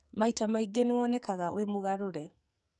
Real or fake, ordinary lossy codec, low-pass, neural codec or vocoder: fake; none; 10.8 kHz; codec, 44.1 kHz, 2.6 kbps, SNAC